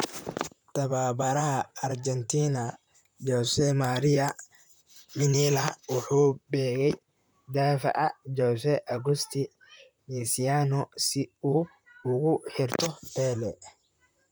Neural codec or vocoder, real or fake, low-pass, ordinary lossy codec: vocoder, 44.1 kHz, 128 mel bands, Pupu-Vocoder; fake; none; none